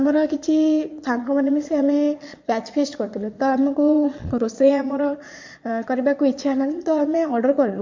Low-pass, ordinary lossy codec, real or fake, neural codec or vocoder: 7.2 kHz; MP3, 48 kbps; fake; vocoder, 22.05 kHz, 80 mel bands, Vocos